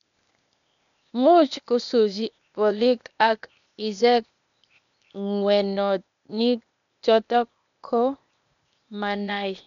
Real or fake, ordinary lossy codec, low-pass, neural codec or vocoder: fake; none; 7.2 kHz; codec, 16 kHz, 0.8 kbps, ZipCodec